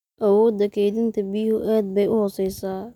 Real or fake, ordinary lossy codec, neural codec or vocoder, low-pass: real; none; none; 19.8 kHz